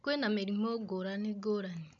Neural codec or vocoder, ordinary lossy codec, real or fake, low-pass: none; AAC, 64 kbps; real; 7.2 kHz